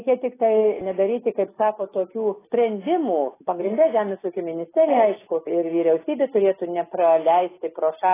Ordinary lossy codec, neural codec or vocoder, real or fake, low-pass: AAC, 16 kbps; none; real; 3.6 kHz